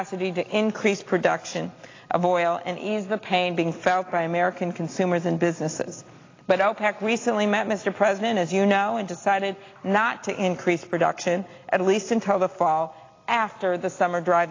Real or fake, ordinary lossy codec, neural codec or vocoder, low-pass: real; AAC, 32 kbps; none; 7.2 kHz